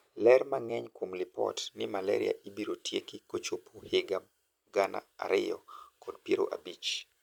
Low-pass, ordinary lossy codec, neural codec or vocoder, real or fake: 19.8 kHz; none; vocoder, 44.1 kHz, 128 mel bands every 256 samples, BigVGAN v2; fake